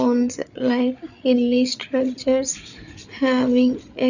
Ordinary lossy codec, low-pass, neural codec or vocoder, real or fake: none; 7.2 kHz; codec, 16 kHz, 8 kbps, FreqCodec, larger model; fake